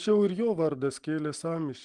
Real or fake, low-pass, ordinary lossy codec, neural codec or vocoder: real; 10.8 kHz; Opus, 24 kbps; none